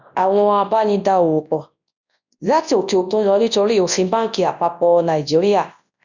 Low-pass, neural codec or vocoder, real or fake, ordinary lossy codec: 7.2 kHz; codec, 24 kHz, 0.9 kbps, WavTokenizer, large speech release; fake; none